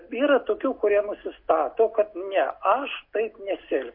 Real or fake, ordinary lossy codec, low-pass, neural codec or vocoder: real; MP3, 32 kbps; 5.4 kHz; none